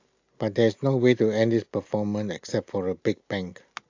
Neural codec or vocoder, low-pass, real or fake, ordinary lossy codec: none; 7.2 kHz; real; AAC, 48 kbps